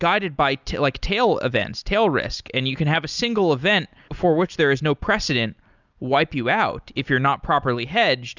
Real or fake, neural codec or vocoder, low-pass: real; none; 7.2 kHz